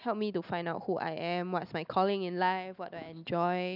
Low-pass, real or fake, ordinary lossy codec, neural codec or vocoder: 5.4 kHz; real; none; none